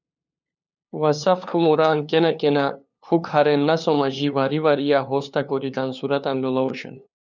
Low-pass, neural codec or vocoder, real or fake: 7.2 kHz; codec, 16 kHz, 2 kbps, FunCodec, trained on LibriTTS, 25 frames a second; fake